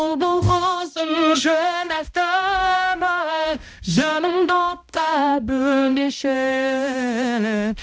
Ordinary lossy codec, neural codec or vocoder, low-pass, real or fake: none; codec, 16 kHz, 0.5 kbps, X-Codec, HuBERT features, trained on balanced general audio; none; fake